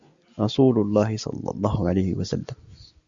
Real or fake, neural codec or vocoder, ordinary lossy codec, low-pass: real; none; Opus, 64 kbps; 7.2 kHz